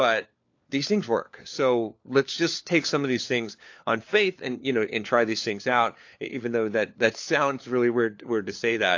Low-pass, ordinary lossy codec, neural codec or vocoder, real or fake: 7.2 kHz; AAC, 48 kbps; codec, 16 kHz, 4 kbps, FunCodec, trained on LibriTTS, 50 frames a second; fake